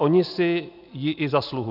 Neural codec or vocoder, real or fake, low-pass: none; real; 5.4 kHz